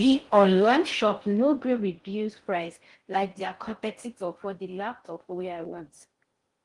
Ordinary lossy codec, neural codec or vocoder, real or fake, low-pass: Opus, 24 kbps; codec, 16 kHz in and 24 kHz out, 0.6 kbps, FocalCodec, streaming, 4096 codes; fake; 10.8 kHz